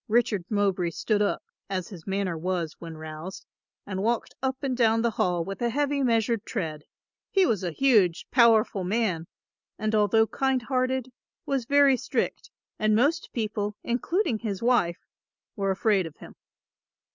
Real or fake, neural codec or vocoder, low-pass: real; none; 7.2 kHz